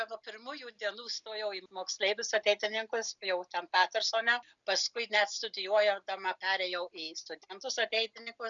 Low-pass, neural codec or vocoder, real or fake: 7.2 kHz; none; real